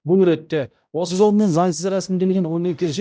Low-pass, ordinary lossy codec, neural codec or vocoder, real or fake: none; none; codec, 16 kHz, 0.5 kbps, X-Codec, HuBERT features, trained on balanced general audio; fake